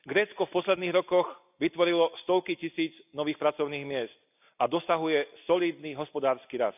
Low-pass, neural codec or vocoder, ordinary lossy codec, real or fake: 3.6 kHz; none; none; real